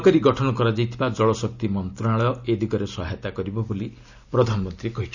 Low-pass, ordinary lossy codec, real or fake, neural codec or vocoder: 7.2 kHz; none; real; none